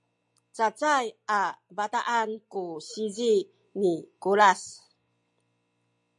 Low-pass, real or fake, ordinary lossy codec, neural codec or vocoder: 10.8 kHz; real; MP3, 64 kbps; none